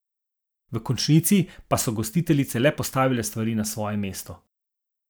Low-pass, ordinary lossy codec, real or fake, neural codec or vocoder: none; none; fake; vocoder, 44.1 kHz, 128 mel bands every 512 samples, BigVGAN v2